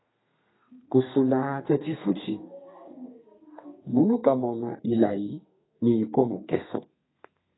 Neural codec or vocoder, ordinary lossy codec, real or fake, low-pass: codec, 32 kHz, 1.9 kbps, SNAC; AAC, 16 kbps; fake; 7.2 kHz